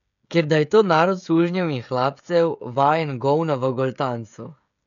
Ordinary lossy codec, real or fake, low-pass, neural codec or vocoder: none; fake; 7.2 kHz; codec, 16 kHz, 16 kbps, FreqCodec, smaller model